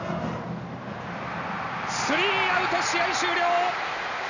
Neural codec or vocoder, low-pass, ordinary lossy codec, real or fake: none; 7.2 kHz; none; real